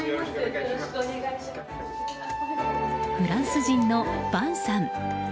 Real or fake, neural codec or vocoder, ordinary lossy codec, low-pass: real; none; none; none